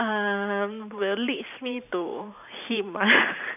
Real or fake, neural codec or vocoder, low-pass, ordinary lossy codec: fake; vocoder, 44.1 kHz, 128 mel bands every 512 samples, BigVGAN v2; 3.6 kHz; none